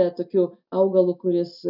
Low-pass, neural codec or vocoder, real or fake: 5.4 kHz; none; real